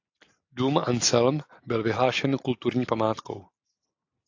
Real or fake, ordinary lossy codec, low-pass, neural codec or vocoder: real; AAC, 48 kbps; 7.2 kHz; none